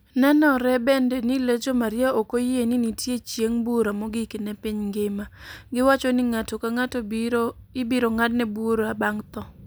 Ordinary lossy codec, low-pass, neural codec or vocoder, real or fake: none; none; none; real